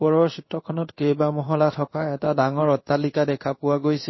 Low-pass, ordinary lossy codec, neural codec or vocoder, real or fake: 7.2 kHz; MP3, 24 kbps; codec, 16 kHz in and 24 kHz out, 1 kbps, XY-Tokenizer; fake